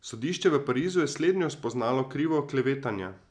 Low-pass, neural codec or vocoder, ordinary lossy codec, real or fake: 9.9 kHz; none; none; real